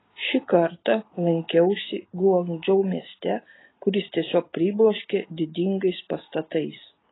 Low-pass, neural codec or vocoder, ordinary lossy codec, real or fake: 7.2 kHz; none; AAC, 16 kbps; real